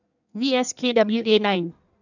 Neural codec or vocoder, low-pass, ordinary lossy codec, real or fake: codec, 16 kHz in and 24 kHz out, 1.1 kbps, FireRedTTS-2 codec; 7.2 kHz; none; fake